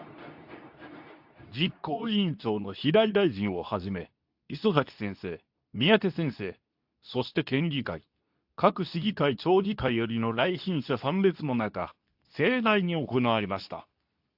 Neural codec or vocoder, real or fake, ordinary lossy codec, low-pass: codec, 24 kHz, 0.9 kbps, WavTokenizer, medium speech release version 2; fake; AAC, 48 kbps; 5.4 kHz